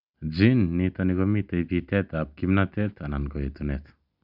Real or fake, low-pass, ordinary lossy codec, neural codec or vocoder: real; 5.4 kHz; none; none